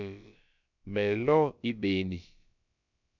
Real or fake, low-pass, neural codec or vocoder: fake; 7.2 kHz; codec, 16 kHz, about 1 kbps, DyCAST, with the encoder's durations